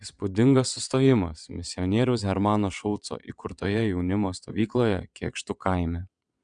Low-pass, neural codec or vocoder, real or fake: 9.9 kHz; vocoder, 22.05 kHz, 80 mel bands, WaveNeXt; fake